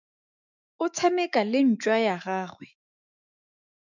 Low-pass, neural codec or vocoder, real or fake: 7.2 kHz; autoencoder, 48 kHz, 128 numbers a frame, DAC-VAE, trained on Japanese speech; fake